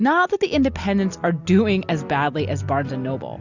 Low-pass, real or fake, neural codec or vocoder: 7.2 kHz; real; none